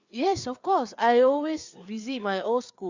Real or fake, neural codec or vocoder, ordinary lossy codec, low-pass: fake; codec, 16 kHz, 4 kbps, FreqCodec, larger model; Opus, 64 kbps; 7.2 kHz